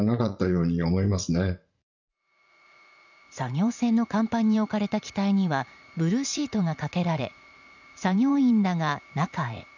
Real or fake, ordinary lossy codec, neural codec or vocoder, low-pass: fake; none; vocoder, 44.1 kHz, 80 mel bands, Vocos; 7.2 kHz